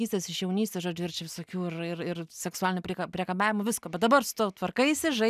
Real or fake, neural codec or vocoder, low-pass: real; none; 14.4 kHz